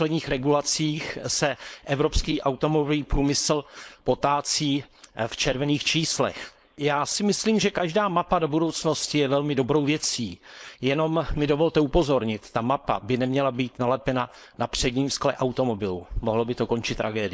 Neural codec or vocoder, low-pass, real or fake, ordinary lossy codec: codec, 16 kHz, 4.8 kbps, FACodec; none; fake; none